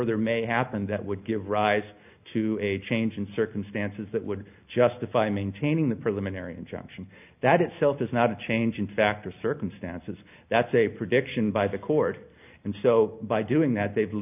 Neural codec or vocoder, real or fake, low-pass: none; real; 3.6 kHz